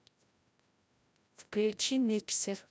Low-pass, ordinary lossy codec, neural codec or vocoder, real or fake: none; none; codec, 16 kHz, 0.5 kbps, FreqCodec, larger model; fake